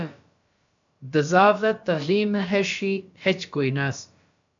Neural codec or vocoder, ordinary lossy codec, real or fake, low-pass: codec, 16 kHz, about 1 kbps, DyCAST, with the encoder's durations; AAC, 64 kbps; fake; 7.2 kHz